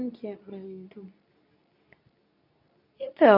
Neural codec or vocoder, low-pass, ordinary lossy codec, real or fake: codec, 24 kHz, 0.9 kbps, WavTokenizer, medium speech release version 2; 5.4 kHz; none; fake